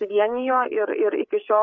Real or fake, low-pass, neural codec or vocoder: fake; 7.2 kHz; codec, 16 kHz, 8 kbps, FreqCodec, larger model